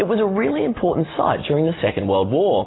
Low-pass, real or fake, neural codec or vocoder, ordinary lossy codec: 7.2 kHz; real; none; AAC, 16 kbps